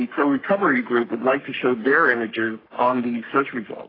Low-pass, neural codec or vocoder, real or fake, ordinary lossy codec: 5.4 kHz; codec, 44.1 kHz, 3.4 kbps, Pupu-Codec; fake; AAC, 24 kbps